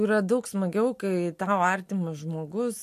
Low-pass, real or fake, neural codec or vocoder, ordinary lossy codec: 14.4 kHz; real; none; MP3, 64 kbps